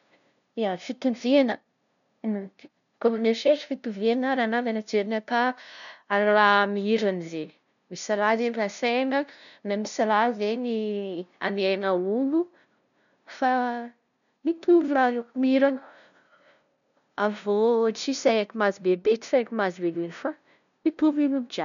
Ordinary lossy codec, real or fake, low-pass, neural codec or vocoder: none; fake; 7.2 kHz; codec, 16 kHz, 0.5 kbps, FunCodec, trained on LibriTTS, 25 frames a second